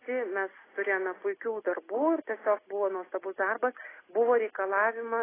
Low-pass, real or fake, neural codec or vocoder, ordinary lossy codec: 3.6 kHz; real; none; AAC, 16 kbps